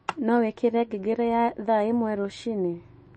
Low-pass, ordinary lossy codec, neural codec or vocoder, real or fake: 10.8 kHz; MP3, 32 kbps; autoencoder, 48 kHz, 128 numbers a frame, DAC-VAE, trained on Japanese speech; fake